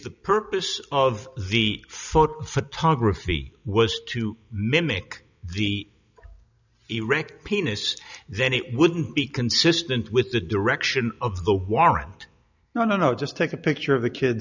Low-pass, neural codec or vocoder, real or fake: 7.2 kHz; none; real